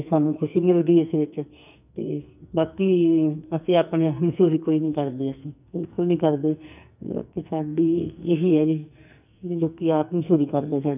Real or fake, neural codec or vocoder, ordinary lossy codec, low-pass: fake; codec, 44.1 kHz, 2.6 kbps, SNAC; none; 3.6 kHz